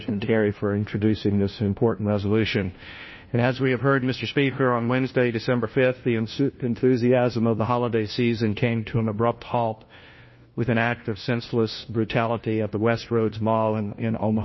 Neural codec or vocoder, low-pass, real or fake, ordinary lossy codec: codec, 16 kHz, 1 kbps, FunCodec, trained on LibriTTS, 50 frames a second; 7.2 kHz; fake; MP3, 24 kbps